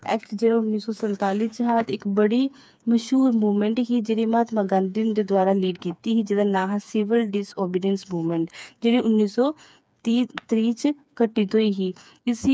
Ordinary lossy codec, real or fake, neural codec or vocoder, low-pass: none; fake; codec, 16 kHz, 4 kbps, FreqCodec, smaller model; none